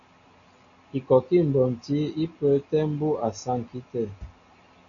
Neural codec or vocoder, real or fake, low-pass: none; real; 7.2 kHz